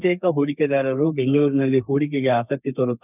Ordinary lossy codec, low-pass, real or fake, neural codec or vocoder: none; 3.6 kHz; fake; codec, 32 kHz, 1.9 kbps, SNAC